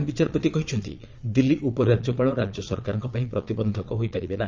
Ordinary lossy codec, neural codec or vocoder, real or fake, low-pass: Opus, 32 kbps; vocoder, 22.05 kHz, 80 mel bands, Vocos; fake; 7.2 kHz